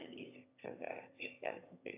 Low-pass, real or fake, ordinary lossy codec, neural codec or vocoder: 3.6 kHz; fake; AAC, 32 kbps; autoencoder, 22.05 kHz, a latent of 192 numbers a frame, VITS, trained on one speaker